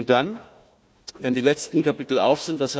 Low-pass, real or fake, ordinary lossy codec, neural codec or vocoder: none; fake; none; codec, 16 kHz, 1 kbps, FunCodec, trained on Chinese and English, 50 frames a second